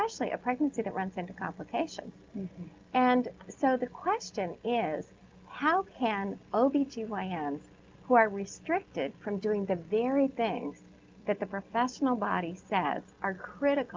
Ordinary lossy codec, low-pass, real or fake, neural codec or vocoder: Opus, 32 kbps; 7.2 kHz; real; none